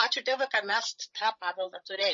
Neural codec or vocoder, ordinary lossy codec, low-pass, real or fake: codec, 16 kHz, 16 kbps, FreqCodec, larger model; MP3, 32 kbps; 7.2 kHz; fake